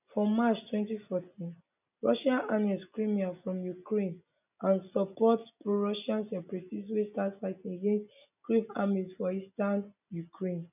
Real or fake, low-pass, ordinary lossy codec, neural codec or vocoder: real; 3.6 kHz; none; none